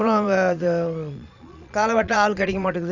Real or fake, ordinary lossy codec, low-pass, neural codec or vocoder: fake; none; 7.2 kHz; vocoder, 22.05 kHz, 80 mel bands, Vocos